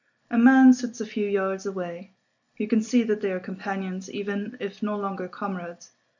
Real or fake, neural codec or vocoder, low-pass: real; none; 7.2 kHz